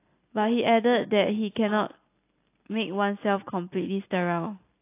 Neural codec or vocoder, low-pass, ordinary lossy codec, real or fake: none; 3.6 kHz; AAC, 24 kbps; real